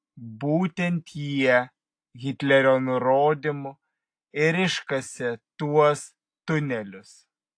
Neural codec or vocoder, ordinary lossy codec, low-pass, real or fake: none; AAC, 48 kbps; 9.9 kHz; real